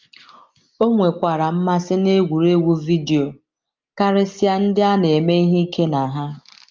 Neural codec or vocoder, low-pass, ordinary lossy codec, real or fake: none; 7.2 kHz; Opus, 24 kbps; real